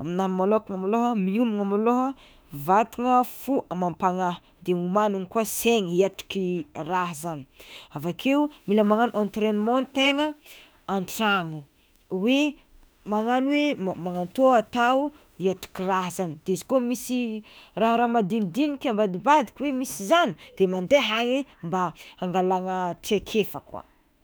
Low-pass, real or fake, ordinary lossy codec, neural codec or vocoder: none; fake; none; autoencoder, 48 kHz, 32 numbers a frame, DAC-VAE, trained on Japanese speech